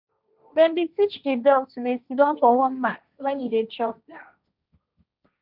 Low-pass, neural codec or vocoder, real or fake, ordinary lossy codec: 5.4 kHz; codec, 16 kHz, 1.1 kbps, Voila-Tokenizer; fake; none